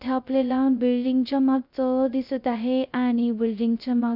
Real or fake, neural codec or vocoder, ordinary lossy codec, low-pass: fake; codec, 16 kHz, 0.2 kbps, FocalCodec; none; 5.4 kHz